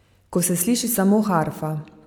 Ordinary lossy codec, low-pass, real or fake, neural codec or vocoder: none; 19.8 kHz; real; none